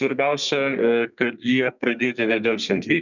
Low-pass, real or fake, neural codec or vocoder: 7.2 kHz; fake; codec, 32 kHz, 1.9 kbps, SNAC